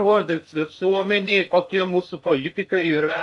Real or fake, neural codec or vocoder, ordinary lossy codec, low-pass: fake; codec, 16 kHz in and 24 kHz out, 0.6 kbps, FocalCodec, streaming, 2048 codes; AAC, 64 kbps; 10.8 kHz